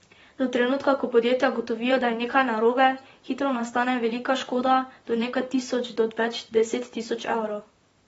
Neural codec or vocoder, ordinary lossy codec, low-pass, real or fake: vocoder, 44.1 kHz, 128 mel bands, Pupu-Vocoder; AAC, 24 kbps; 19.8 kHz; fake